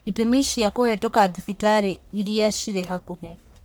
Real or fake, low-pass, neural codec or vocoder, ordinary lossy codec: fake; none; codec, 44.1 kHz, 1.7 kbps, Pupu-Codec; none